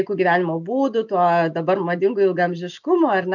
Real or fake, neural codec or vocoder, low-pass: real; none; 7.2 kHz